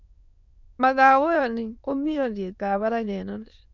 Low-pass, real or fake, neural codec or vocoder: 7.2 kHz; fake; autoencoder, 22.05 kHz, a latent of 192 numbers a frame, VITS, trained on many speakers